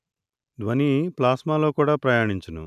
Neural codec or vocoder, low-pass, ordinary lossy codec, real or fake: none; 14.4 kHz; none; real